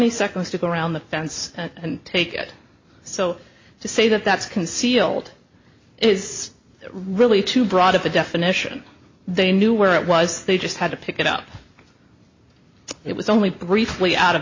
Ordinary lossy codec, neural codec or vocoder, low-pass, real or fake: MP3, 32 kbps; none; 7.2 kHz; real